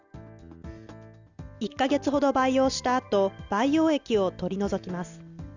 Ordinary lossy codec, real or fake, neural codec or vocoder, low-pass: none; real; none; 7.2 kHz